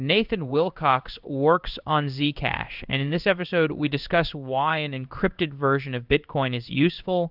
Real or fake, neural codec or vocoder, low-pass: fake; codec, 16 kHz in and 24 kHz out, 1 kbps, XY-Tokenizer; 5.4 kHz